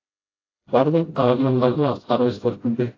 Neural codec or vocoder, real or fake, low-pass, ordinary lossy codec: codec, 16 kHz, 0.5 kbps, FreqCodec, smaller model; fake; 7.2 kHz; AAC, 32 kbps